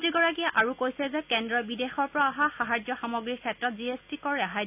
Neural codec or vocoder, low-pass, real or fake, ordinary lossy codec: none; 3.6 kHz; real; none